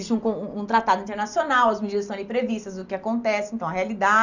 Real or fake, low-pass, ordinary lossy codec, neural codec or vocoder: real; 7.2 kHz; none; none